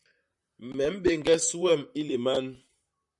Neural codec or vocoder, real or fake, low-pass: vocoder, 44.1 kHz, 128 mel bands, Pupu-Vocoder; fake; 10.8 kHz